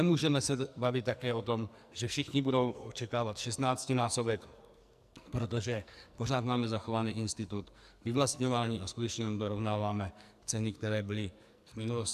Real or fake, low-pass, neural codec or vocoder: fake; 14.4 kHz; codec, 44.1 kHz, 2.6 kbps, SNAC